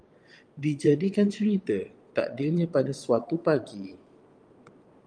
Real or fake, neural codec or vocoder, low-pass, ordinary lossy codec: fake; vocoder, 22.05 kHz, 80 mel bands, Vocos; 9.9 kHz; Opus, 32 kbps